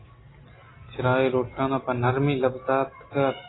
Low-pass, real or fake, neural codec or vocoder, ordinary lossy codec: 7.2 kHz; real; none; AAC, 16 kbps